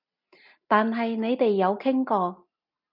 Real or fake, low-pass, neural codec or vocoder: real; 5.4 kHz; none